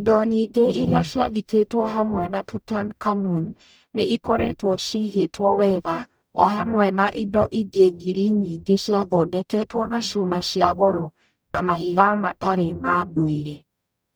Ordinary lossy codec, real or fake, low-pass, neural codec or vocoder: none; fake; none; codec, 44.1 kHz, 0.9 kbps, DAC